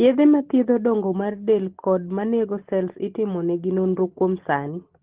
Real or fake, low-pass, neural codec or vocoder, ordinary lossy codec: real; 3.6 kHz; none; Opus, 16 kbps